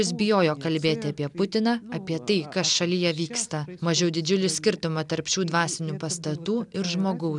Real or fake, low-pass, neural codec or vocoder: real; 10.8 kHz; none